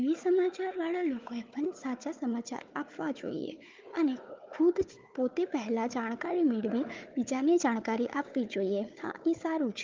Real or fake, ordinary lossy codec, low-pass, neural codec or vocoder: fake; Opus, 32 kbps; 7.2 kHz; codec, 16 kHz, 4 kbps, FunCodec, trained on Chinese and English, 50 frames a second